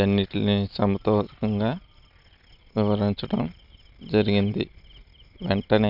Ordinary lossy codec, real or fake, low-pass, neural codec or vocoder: none; real; 5.4 kHz; none